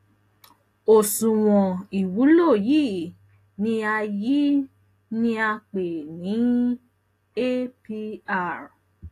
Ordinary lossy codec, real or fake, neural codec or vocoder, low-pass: AAC, 48 kbps; real; none; 14.4 kHz